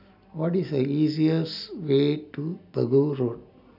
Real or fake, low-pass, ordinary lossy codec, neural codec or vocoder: real; 5.4 kHz; none; none